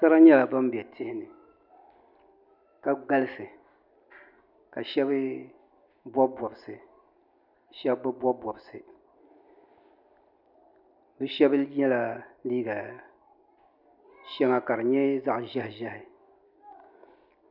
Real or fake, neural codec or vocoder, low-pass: real; none; 5.4 kHz